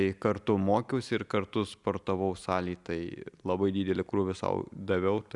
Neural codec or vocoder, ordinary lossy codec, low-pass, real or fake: none; Opus, 64 kbps; 10.8 kHz; real